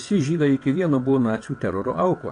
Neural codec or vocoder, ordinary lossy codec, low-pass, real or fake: vocoder, 22.05 kHz, 80 mel bands, WaveNeXt; AAC, 48 kbps; 9.9 kHz; fake